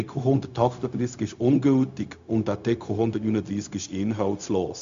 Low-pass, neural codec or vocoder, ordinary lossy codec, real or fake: 7.2 kHz; codec, 16 kHz, 0.4 kbps, LongCat-Audio-Codec; MP3, 64 kbps; fake